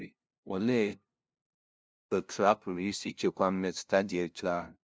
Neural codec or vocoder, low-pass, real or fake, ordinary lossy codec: codec, 16 kHz, 0.5 kbps, FunCodec, trained on LibriTTS, 25 frames a second; none; fake; none